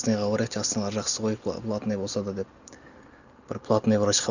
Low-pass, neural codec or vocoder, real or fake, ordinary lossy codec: 7.2 kHz; none; real; none